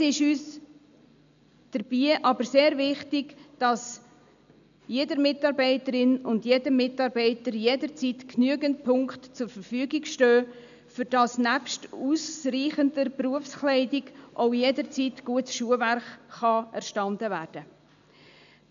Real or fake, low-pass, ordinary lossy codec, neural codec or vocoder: real; 7.2 kHz; none; none